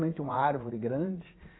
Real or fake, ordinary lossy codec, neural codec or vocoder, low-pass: fake; AAC, 16 kbps; vocoder, 44.1 kHz, 80 mel bands, Vocos; 7.2 kHz